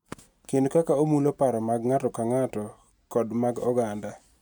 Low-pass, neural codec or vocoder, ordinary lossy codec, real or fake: 19.8 kHz; none; none; real